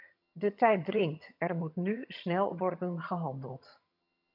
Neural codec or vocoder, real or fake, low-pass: vocoder, 22.05 kHz, 80 mel bands, HiFi-GAN; fake; 5.4 kHz